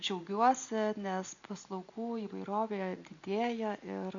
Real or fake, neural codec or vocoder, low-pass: real; none; 7.2 kHz